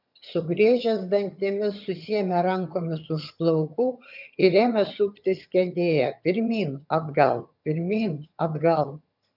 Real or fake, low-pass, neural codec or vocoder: fake; 5.4 kHz; vocoder, 22.05 kHz, 80 mel bands, HiFi-GAN